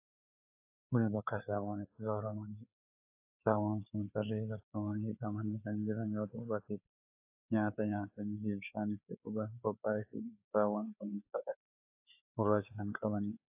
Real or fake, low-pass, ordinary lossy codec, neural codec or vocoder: fake; 3.6 kHz; AAC, 32 kbps; codec, 16 kHz, 4 kbps, FreqCodec, larger model